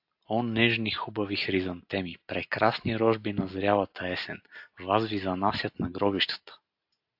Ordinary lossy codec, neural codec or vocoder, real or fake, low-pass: AAC, 48 kbps; none; real; 5.4 kHz